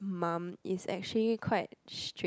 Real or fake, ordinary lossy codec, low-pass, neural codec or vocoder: real; none; none; none